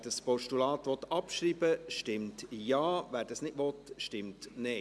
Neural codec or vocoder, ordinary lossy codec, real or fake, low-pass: none; none; real; none